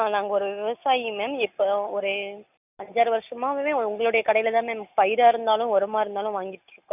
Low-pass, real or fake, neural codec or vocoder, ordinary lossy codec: 3.6 kHz; real; none; none